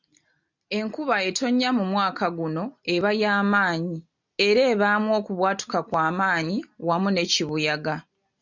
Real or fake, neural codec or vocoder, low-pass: real; none; 7.2 kHz